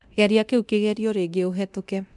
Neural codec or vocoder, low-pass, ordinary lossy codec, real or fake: codec, 24 kHz, 0.9 kbps, DualCodec; 10.8 kHz; MP3, 96 kbps; fake